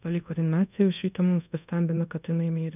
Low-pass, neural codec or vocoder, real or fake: 3.6 kHz; codec, 24 kHz, 0.5 kbps, DualCodec; fake